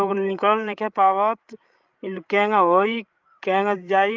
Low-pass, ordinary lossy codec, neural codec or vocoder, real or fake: 7.2 kHz; Opus, 32 kbps; codec, 16 kHz, 16 kbps, FreqCodec, larger model; fake